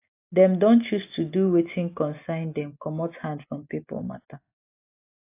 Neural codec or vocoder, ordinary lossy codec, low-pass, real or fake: none; AAC, 24 kbps; 3.6 kHz; real